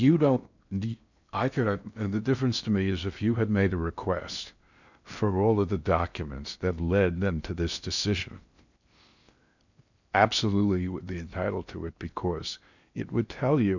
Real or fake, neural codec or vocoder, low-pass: fake; codec, 16 kHz in and 24 kHz out, 0.6 kbps, FocalCodec, streaming, 4096 codes; 7.2 kHz